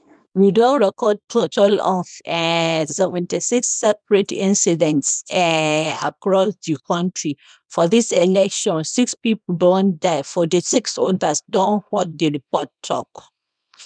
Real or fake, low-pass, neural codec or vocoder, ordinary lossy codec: fake; 9.9 kHz; codec, 24 kHz, 0.9 kbps, WavTokenizer, small release; none